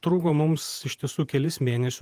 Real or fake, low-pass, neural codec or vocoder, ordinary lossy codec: fake; 14.4 kHz; vocoder, 48 kHz, 128 mel bands, Vocos; Opus, 32 kbps